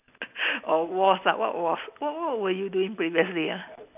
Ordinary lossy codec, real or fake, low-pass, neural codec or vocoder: none; real; 3.6 kHz; none